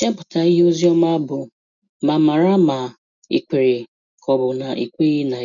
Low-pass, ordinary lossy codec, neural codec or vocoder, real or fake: 7.2 kHz; none; none; real